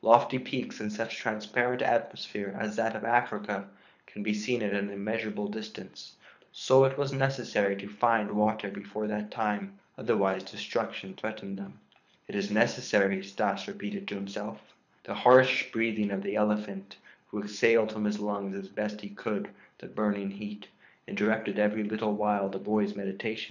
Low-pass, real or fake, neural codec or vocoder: 7.2 kHz; fake; codec, 24 kHz, 6 kbps, HILCodec